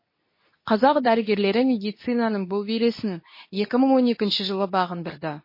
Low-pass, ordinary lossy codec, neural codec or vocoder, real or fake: 5.4 kHz; MP3, 24 kbps; codec, 24 kHz, 0.9 kbps, WavTokenizer, medium speech release version 2; fake